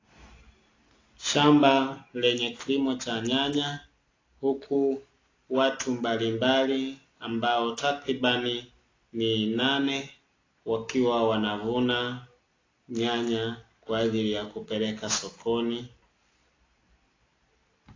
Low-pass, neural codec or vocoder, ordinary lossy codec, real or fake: 7.2 kHz; none; AAC, 32 kbps; real